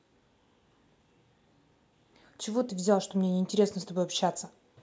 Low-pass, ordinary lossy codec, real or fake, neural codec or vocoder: none; none; real; none